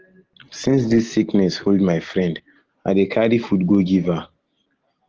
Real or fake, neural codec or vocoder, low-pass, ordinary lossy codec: real; none; 7.2 kHz; Opus, 16 kbps